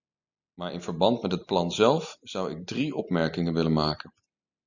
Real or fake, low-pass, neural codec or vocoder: real; 7.2 kHz; none